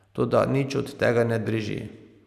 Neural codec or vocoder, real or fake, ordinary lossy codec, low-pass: none; real; none; 14.4 kHz